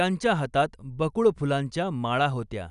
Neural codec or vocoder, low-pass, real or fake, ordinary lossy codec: none; 10.8 kHz; real; none